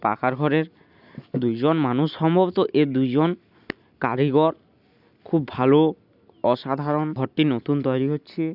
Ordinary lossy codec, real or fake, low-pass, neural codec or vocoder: none; real; 5.4 kHz; none